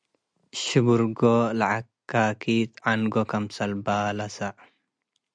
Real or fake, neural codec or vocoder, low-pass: real; none; 9.9 kHz